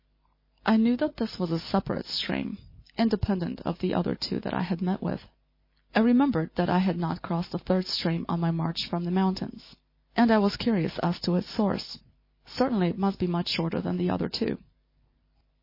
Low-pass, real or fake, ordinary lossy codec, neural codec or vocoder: 5.4 kHz; real; MP3, 24 kbps; none